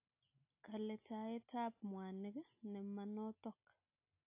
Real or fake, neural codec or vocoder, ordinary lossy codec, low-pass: real; none; AAC, 32 kbps; 3.6 kHz